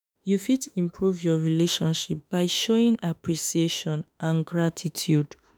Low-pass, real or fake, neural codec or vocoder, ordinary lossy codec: none; fake; autoencoder, 48 kHz, 32 numbers a frame, DAC-VAE, trained on Japanese speech; none